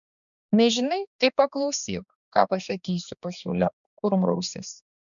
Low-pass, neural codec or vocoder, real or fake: 7.2 kHz; codec, 16 kHz, 2 kbps, X-Codec, HuBERT features, trained on balanced general audio; fake